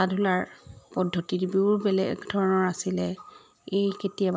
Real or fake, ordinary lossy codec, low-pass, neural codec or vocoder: real; none; none; none